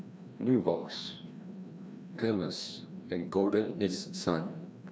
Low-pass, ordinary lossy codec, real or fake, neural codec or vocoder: none; none; fake; codec, 16 kHz, 1 kbps, FreqCodec, larger model